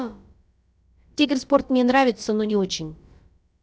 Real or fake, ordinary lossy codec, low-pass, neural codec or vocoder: fake; none; none; codec, 16 kHz, about 1 kbps, DyCAST, with the encoder's durations